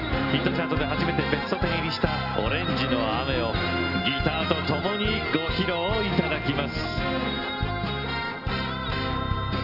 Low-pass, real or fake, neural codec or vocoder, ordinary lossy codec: 5.4 kHz; real; none; none